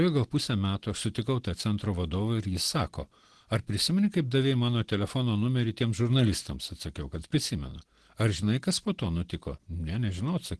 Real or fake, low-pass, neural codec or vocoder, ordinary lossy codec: real; 10.8 kHz; none; Opus, 16 kbps